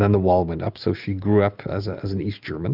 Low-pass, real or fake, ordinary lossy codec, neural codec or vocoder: 5.4 kHz; real; Opus, 32 kbps; none